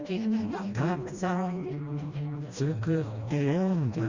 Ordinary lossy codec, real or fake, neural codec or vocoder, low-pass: Opus, 64 kbps; fake; codec, 16 kHz, 1 kbps, FreqCodec, smaller model; 7.2 kHz